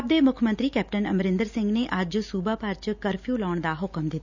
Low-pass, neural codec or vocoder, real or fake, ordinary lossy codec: 7.2 kHz; none; real; none